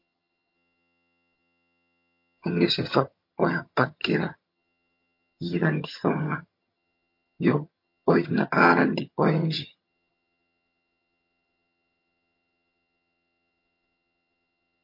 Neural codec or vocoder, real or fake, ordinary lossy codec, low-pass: vocoder, 22.05 kHz, 80 mel bands, HiFi-GAN; fake; MP3, 32 kbps; 5.4 kHz